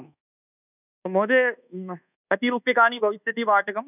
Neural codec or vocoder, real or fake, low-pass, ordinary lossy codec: codec, 24 kHz, 1.2 kbps, DualCodec; fake; 3.6 kHz; none